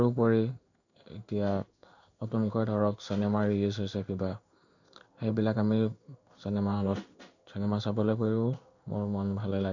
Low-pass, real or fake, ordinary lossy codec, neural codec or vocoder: 7.2 kHz; fake; none; codec, 16 kHz in and 24 kHz out, 1 kbps, XY-Tokenizer